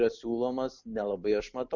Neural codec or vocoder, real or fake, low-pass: none; real; 7.2 kHz